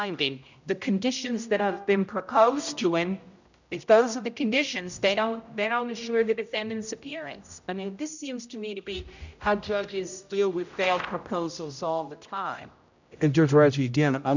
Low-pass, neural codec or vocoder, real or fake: 7.2 kHz; codec, 16 kHz, 0.5 kbps, X-Codec, HuBERT features, trained on general audio; fake